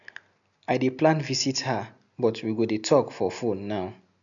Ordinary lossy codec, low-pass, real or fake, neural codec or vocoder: none; 7.2 kHz; real; none